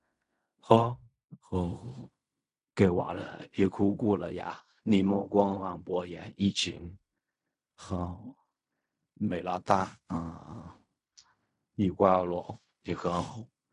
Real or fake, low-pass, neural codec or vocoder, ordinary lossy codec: fake; 10.8 kHz; codec, 16 kHz in and 24 kHz out, 0.4 kbps, LongCat-Audio-Codec, fine tuned four codebook decoder; AAC, 96 kbps